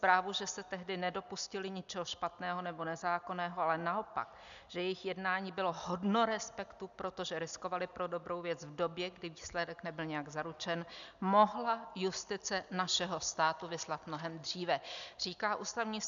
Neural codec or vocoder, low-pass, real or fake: none; 7.2 kHz; real